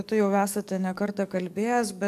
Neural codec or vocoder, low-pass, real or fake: codec, 44.1 kHz, 7.8 kbps, DAC; 14.4 kHz; fake